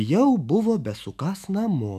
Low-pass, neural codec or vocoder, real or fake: 14.4 kHz; autoencoder, 48 kHz, 128 numbers a frame, DAC-VAE, trained on Japanese speech; fake